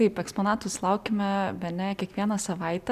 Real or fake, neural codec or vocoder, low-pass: real; none; 14.4 kHz